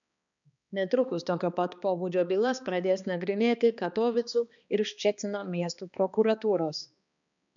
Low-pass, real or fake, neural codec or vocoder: 7.2 kHz; fake; codec, 16 kHz, 2 kbps, X-Codec, HuBERT features, trained on balanced general audio